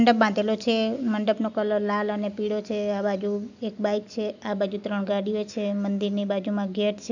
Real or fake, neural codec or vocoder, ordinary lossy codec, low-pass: real; none; none; 7.2 kHz